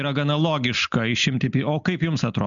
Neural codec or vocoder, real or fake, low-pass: none; real; 7.2 kHz